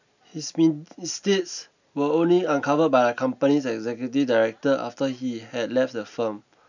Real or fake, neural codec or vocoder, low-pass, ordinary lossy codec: real; none; 7.2 kHz; none